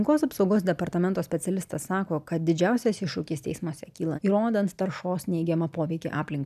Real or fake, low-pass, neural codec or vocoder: real; 14.4 kHz; none